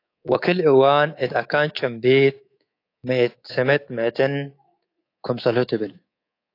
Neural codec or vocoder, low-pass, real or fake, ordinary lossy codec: codec, 24 kHz, 3.1 kbps, DualCodec; 5.4 kHz; fake; AAC, 32 kbps